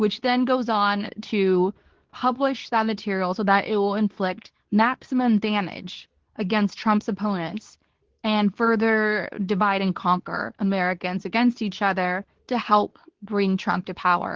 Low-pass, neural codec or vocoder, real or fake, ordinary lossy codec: 7.2 kHz; codec, 24 kHz, 0.9 kbps, WavTokenizer, medium speech release version 2; fake; Opus, 16 kbps